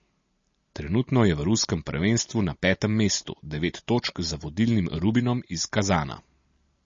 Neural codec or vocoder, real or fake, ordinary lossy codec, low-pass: none; real; MP3, 32 kbps; 7.2 kHz